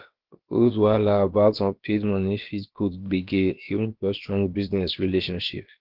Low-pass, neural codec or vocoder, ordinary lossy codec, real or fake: 5.4 kHz; codec, 16 kHz, about 1 kbps, DyCAST, with the encoder's durations; Opus, 16 kbps; fake